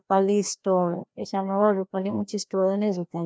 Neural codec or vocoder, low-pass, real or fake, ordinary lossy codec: codec, 16 kHz, 1 kbps, FreqCodec, larger model; none; fake; none